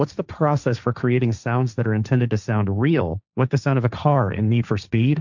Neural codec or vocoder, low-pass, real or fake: codec, 16 kHz, 1.1 kbps, Voila-Tokenizer; 7.2 kHz; fake